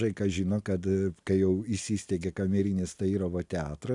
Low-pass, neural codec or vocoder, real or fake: 10.8 kHz; none; real